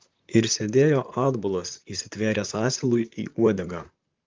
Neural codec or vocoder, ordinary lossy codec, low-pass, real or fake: vocoder, 44.1 kHz, 128 mel bands, Pupu-Vocoder; Opus, 32 kbps; 7.2 kHz; fake